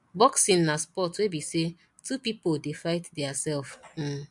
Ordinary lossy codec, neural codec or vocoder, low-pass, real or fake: MP3, 64 kbps; none; 10.8 kHz; real